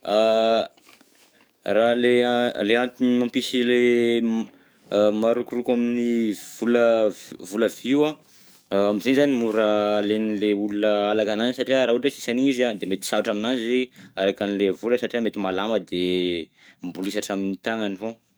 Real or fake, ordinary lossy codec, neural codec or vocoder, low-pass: fake; none; codec, 44.1 kHz, 7.8 kbps, DAC; none